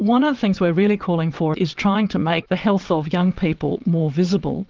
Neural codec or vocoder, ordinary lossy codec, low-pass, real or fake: vocoder, 22.05 kHz, 80 mel bands, WaveNeXt; Opus, 24 kbps; 7.2 kHz; fake